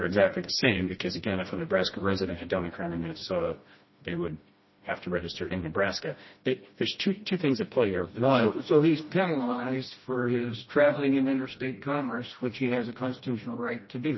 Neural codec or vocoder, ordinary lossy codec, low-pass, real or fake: codec, 16 kHz, 1 kbps, FreqCodec, smaller model; MP3, 24 kbps; 7.2 kHz; fake